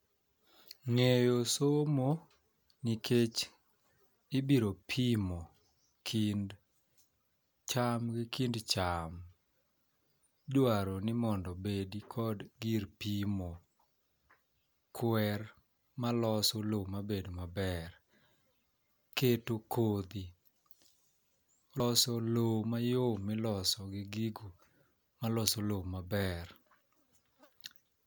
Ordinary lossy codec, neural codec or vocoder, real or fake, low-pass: none; none; real; none